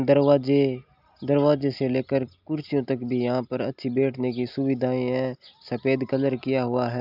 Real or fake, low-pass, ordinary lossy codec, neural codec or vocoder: real; 5.4 kHz; none; none